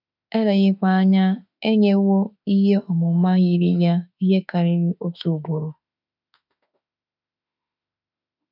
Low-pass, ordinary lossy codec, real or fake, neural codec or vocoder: 5.4 kHz; none; fake; autoencoder, 48 kHz, 32 numbers a frame, DAC-VAE, trained on Japanese speech